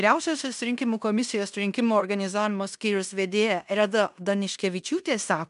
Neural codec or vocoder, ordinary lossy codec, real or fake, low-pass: codec, 16 kHz in and 24 kHz out, 0.9 kbps, LongCat-Audio-Codec, fine tuned four codebook decoder; MP3, 96 kbps; fake; 10.8 kHz